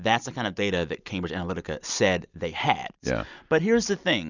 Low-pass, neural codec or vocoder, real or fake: 7.2 kHz; none; real